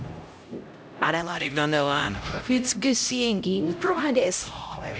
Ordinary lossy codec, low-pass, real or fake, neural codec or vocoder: none; none; fake; codec, 16 kHz, 0.5 kbps, X-Codec, HuBERT features, trained on LibriSpeech